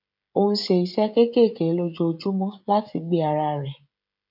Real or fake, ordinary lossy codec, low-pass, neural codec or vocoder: fake; none; 5.4 kHz; codec, 16 kHz, 16 kbps, FreqCodec, smaller model